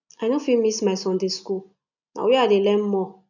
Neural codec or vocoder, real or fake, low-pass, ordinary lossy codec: none; real; 7.2 kHz; none